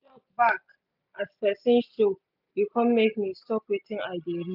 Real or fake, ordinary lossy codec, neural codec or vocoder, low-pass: real; none; none; 5.4 kHz